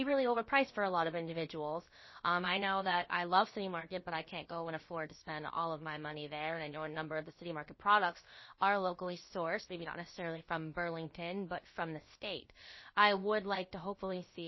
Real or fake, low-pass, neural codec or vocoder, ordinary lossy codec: fake; 7.2 kHz; codec, 16 kHz, about 1 kbps, DyCAST, with the encoder's durations; MP3, 24 kbps